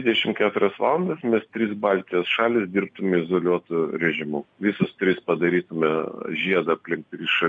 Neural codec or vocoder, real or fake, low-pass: none; real; 9.9 kHz